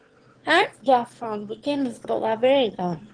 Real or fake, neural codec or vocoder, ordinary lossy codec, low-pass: fake; autoencoder, 22.05 kHz, a latent of 192 numbers a frame, VITS, trained on one speaker; Opus, 16 kbps; 9.9 kHz